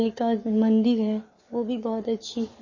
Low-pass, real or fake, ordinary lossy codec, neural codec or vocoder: 7.2 kHz; fake; MP3, 32 kbps; codec, 44.1 kHz, 7.8 kbps, Pupu-Codec